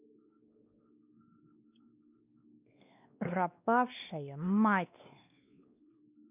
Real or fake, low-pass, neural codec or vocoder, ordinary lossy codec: fake; 3.6 kHz; codec, 16 kHz, 2 kbps, X-Codec, WavLM features, trained on Multilingual LibriSpeech; none